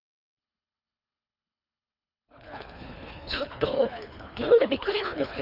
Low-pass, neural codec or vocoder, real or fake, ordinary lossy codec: 5.4 kHz; codec, 24 kHz, 3 kbps, HILCodec; fake; none